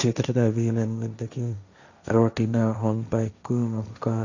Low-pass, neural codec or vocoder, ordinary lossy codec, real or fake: 7.2 kHz; codec, 16 kHz, 1.1 kbps, Voila-Tokenizer; none; fake